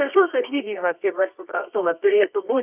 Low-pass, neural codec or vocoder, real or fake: 3.6 kHz; codec, 24 kHz, 0.9 kbps, WavTokenizer, medium music audio release; fake